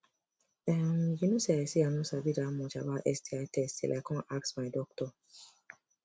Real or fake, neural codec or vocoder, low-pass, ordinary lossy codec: real; none; none; none